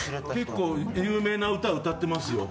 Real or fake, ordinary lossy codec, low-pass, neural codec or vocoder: real; none; none; none